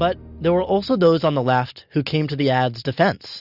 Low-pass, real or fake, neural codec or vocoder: 5.4 kHz; real; none